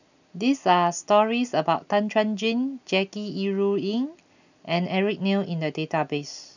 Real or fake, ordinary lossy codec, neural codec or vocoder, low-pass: real; none; none; 7.2 kHz